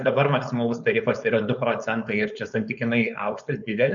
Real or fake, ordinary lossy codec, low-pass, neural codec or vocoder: fake; MP3, 96 kbps; 7.2 kHz; codec, 16 kHz, 4.8 kbps, FACodec